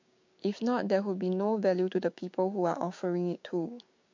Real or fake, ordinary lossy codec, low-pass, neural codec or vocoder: fake; MP3, 48 kbps; 7.2 kHz; codec, 16 kHz, 6 kbps, DAC